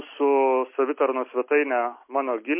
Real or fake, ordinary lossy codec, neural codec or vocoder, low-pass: real; MP3, 24 kbps; none; 3.6 kHz